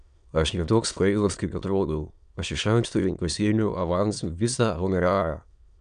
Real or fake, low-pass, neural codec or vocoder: fake; 9.9 kHz; autoencoder, 22.05 kHz, a latent of 192 numbers a frame, VITS, trained on many speakers